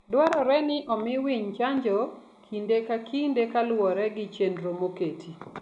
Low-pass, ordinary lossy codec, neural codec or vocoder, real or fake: 10.8 kHz; none; none; real